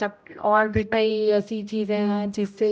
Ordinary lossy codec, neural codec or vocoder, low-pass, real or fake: none; codec, 16 kHz, 0.5 kbps, X-Codec, HuBERT features, trained on general audio; none; fake